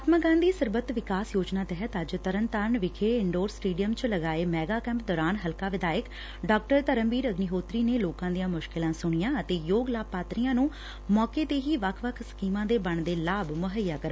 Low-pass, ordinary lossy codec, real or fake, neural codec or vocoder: none; none; real; none